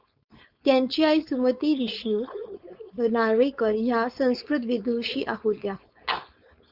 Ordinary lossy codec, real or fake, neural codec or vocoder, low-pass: Opus, 64 kbps; fake; codec, 16 kHz, 4.8 kbps, FACodec; 5.4 kHz